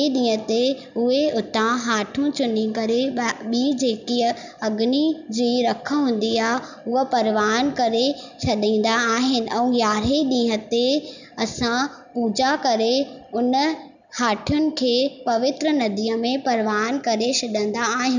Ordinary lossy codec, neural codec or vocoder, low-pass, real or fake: none; none; 7.2 kHz; real